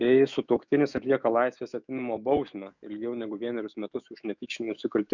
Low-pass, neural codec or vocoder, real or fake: 7.2 kHz; autoencoder, 48 kHz, 128 numbers a frame, DAC-VAE, trained on Japanese speech; fake